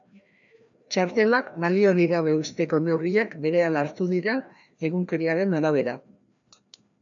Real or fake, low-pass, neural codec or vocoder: fake; 7.2 kHz; codec, 16 kHz, 1 kbps, FreqCodec, larger model